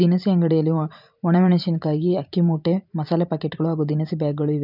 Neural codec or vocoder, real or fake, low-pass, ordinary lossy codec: vocoder, 44.1 kHz, 128 mel bands every 512 samples, BigVGAN v2; fake; 5.4 kHz; none